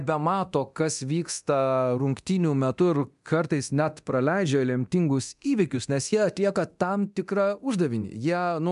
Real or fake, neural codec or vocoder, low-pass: fake; codec, 24 kHz, 0.9 kbps, DualCodec; 10.8 kHz